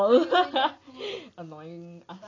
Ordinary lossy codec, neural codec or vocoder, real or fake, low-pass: AAC, 32 kbps; none; real; 7.2 kHz